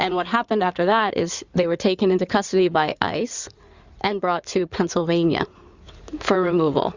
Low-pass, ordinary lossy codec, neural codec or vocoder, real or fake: 7.2 kHz; Opus, 64 kbps; codec, 16 kHz in and 24 kHz out, 2.2 kbps, FireRedTTS-2 codec; fake